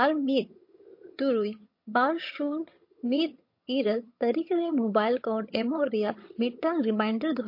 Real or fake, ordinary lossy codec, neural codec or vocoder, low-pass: fake; MP3, 32 kbps; vocoder, 22.05 kHz, 80 mel bands, HiFi-GAN; 5.4 kHz